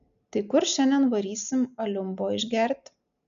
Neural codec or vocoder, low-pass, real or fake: none; 7.2 kHz; real